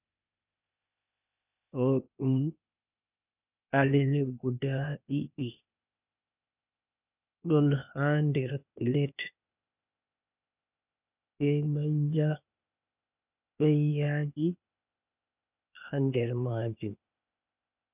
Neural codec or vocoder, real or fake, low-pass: codec, 16 kHz, 0.8 kbps, ZipCodec; fake; 3.6 kHz